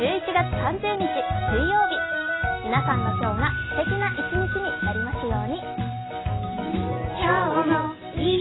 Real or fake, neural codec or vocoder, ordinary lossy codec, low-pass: real; none; AAC, 16 kbps; 7.2 kHz